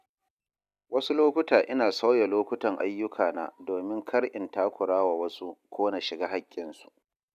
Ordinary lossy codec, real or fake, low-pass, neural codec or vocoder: none; real; 14.4 kHz; none